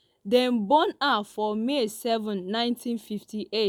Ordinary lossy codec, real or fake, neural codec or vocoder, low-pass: none; real; none; none